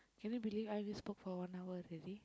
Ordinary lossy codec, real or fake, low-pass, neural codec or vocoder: none; real; none; none